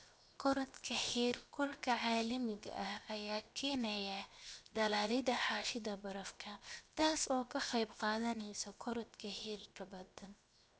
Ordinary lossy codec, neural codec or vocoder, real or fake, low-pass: none; codec, 16 kHz, 0.7 kbps, FocalCodec; fake; none